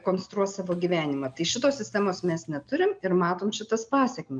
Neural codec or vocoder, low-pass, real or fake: none; 9.9 kHz; real